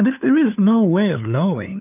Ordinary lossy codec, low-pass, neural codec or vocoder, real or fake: AAC, 32 kbps; 3.6 kHz; codec, 16 kHz, 2 kbps, FunCodec, trained on LibriTTS, 25 frames a second; fake